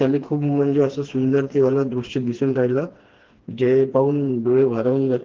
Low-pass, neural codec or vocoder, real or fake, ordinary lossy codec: 7.2 kHz; codec, 16 kHz, 2 kbps, FreqCodec, smaller model; fake; Opus, 16 kbps